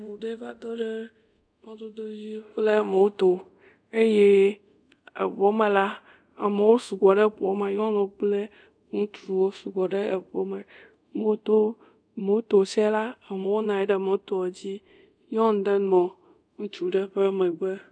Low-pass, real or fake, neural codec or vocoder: 9.9 kHz; fake; codec, 24 kHz, 0.5 kbps, DualCodec